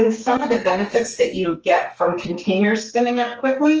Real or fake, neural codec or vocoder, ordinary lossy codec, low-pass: fake; codec, 32 kHz, 1.9 kbps, SNAC; Opus, 24 kbps; 7.2 kHz